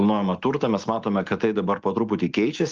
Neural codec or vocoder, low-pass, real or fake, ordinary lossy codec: none; 7.2 kHz; real; Opus, 32 kbps